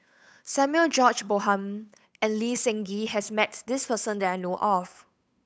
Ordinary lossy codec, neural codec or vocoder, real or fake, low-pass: none; codec, 16 kHz, 8 kbps, FunCodec, trained on Chinese and English, 25 frames a second; fake; none